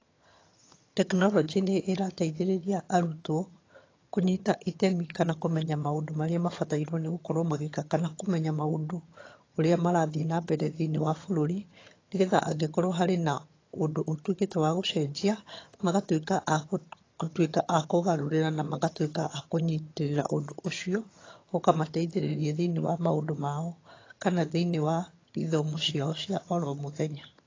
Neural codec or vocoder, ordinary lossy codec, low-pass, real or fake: vocoder, 22.05 kHz, 80 mel bands, HiFi-GAN; AAC, 32 kbps; 7.2 kHz; fake